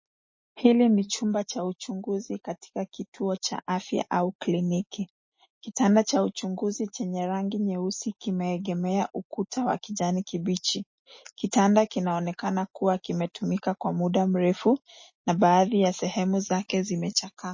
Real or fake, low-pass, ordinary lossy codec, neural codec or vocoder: real; 7.2 kHz; MP3, 32 kbps; none